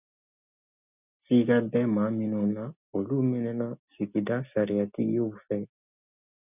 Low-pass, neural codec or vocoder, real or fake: 3.6 kHz; none; real